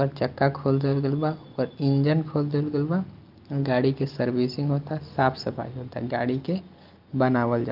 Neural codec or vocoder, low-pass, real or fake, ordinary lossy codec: none; 5.4 kHz; real; Opus, 24 kbps